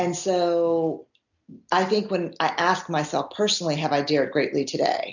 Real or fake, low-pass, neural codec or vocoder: real; 7.2 kHz; none